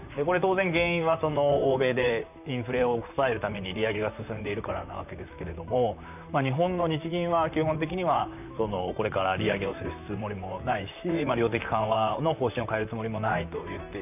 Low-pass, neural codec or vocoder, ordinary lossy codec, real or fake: 3.6 kHz; vocoder, 44.1 kHz, 128 mel bands, Pupu-Vocoder; none; fake